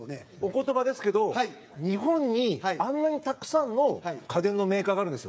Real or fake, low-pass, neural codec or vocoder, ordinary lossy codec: fake; none; codec, 16 kHz, 8 kbps, FreqCodec, smaller model; none